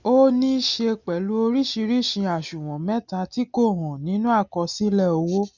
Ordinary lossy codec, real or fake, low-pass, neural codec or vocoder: none; real; 7.2 kHz; none